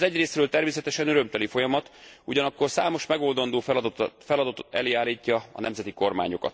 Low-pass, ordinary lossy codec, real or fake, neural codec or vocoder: none; none; real; none